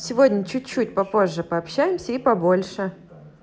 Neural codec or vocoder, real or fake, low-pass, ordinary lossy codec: none; real; none; none